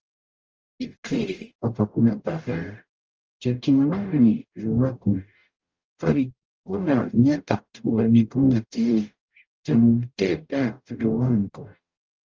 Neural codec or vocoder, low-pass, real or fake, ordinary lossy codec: codec, 44.1 kHz, 0.9 kbps, DAC; 7.2 kHz; fake; Opus, 24 kbps